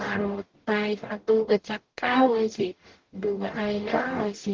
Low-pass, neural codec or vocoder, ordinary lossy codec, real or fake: 7.2 kHz; codec, 44.1 kHz, 0.9 kbps, DAC; Opus, 16 kbps; fake